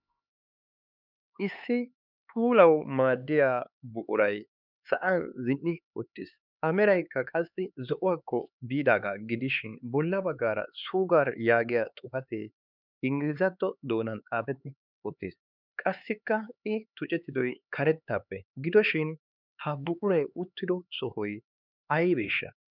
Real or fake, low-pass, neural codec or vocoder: fake; 5.4 kHz; codec, 16 kHz, 4 kbps, X-Codec, HuBERT features, trained on LibriSpeech